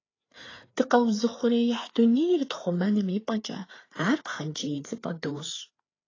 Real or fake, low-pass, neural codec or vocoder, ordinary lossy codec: fake; 7.2 kHz; codec, 16 kHz, 4 kbps, FreqCodec, larger model; AAC, 32 kbps